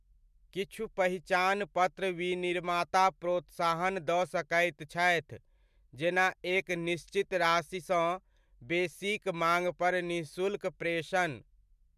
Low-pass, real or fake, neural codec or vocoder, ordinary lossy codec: 14.4 kHz; real; none; MP3, 96 kbps